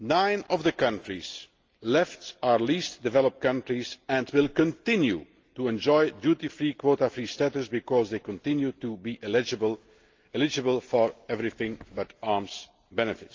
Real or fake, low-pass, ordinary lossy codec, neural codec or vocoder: real; 7.2 kHz; Opus, 24 kbps; none